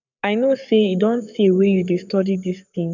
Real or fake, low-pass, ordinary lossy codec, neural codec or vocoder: fake; 7.2 kHz; none; codec, 44.1 kHz, 7.8 kbps, Pupu-Codec